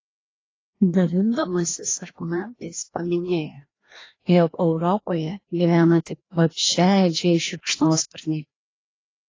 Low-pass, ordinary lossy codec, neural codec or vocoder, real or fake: 7.2 kHz; AAC, 32 kbps; codec, 16 kHz, 1 kbps, FreqCodec, larger model; fake